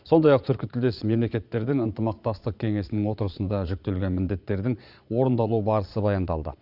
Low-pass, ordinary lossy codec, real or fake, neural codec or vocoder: 5.4 kHz; Opus, 64 kbps; fake; vocoder, 22.05 kHz, 80 mel bands, Vocos